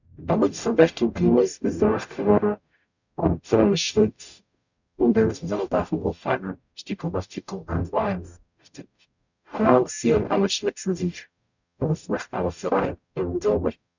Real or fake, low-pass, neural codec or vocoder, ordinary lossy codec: fake; 7.2 kHz; codec, 44.1 kHz, 0.9 kbps, DAC; none